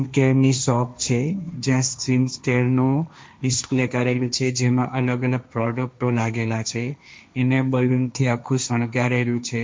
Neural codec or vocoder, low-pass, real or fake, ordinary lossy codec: codec, 16 kHz, 1.1 kbps, Voila-Tokenizer; none; fake; none